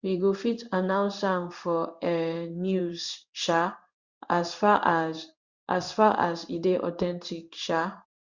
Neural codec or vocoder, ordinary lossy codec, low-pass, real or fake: codec, 16 kHz in and 24 kHz out, 1 kbps, XY-Tokenizer; Opus, 64 kbps; 7.2 kHz; fake